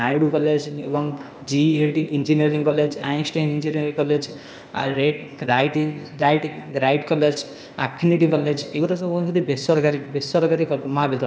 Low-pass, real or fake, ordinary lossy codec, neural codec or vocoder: none; fake; none; codec, 16 kHz, 0.8 kbps, ZipCodec